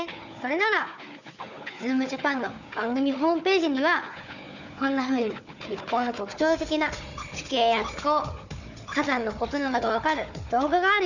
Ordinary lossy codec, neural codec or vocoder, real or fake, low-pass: none; codec, 16 kHz, 4 kbps, FunCodec, trained on Chinese and English, 50 frames a second; fake; 7.2 kHz